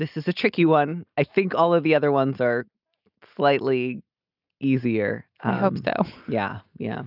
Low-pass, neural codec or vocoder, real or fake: 5.4 kHz; none; real